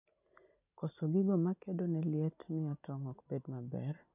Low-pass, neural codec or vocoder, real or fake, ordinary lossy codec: 3.6 kHz; none; real; none